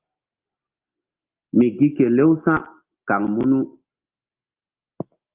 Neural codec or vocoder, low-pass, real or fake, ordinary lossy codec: none; 3.6 kHz; real; Opus, 32 kbps